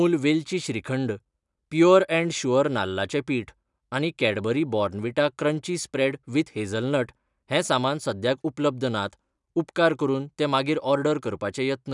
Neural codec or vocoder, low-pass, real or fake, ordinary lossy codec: none; 10.8 kHz; real; none